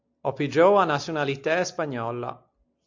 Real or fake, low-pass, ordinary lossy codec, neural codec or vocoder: real; 7.2 kHz; AAC, 48 kbps; none